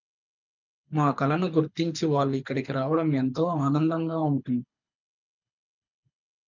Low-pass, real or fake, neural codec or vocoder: 7.2 kHz; fake; codec, 24 kHz, 6 kbps, HILCodec